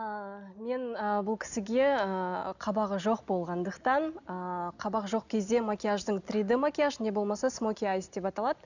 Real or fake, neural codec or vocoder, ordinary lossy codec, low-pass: real; none; none; 7.2 kHz